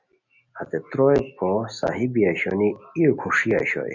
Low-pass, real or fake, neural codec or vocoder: 7.2 kHz; real; none